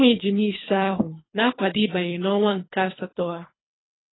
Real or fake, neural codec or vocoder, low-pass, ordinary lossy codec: fake; codec, 24 kHz, 3 kbps, HILCodec; 7.2 kHz; AAC, 16 kbps